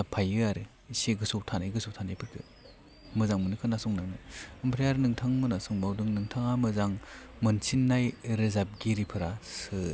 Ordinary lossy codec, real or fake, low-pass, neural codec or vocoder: none; real; none; none